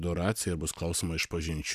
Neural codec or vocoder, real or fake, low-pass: none; real; 14.4 kHz